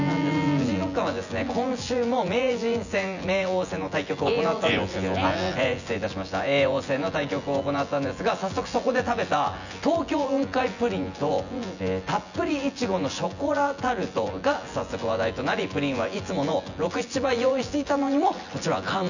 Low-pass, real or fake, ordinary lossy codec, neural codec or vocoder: 7.2 kHz; fake; none; vocoder, 24 kHz, 100 mel bands, Vocos